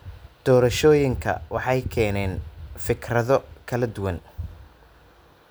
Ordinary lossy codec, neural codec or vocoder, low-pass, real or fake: none; none; none; real